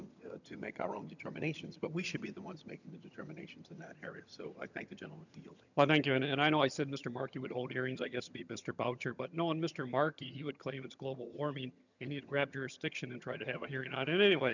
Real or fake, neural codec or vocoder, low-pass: fake; vocoder, 22.05 kHz, 80 mel bands, HiFi-GAN; 7.2 kHz